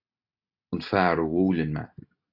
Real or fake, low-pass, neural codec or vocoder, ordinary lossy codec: real; 5.4 kHz; none; AAC, 48 kbps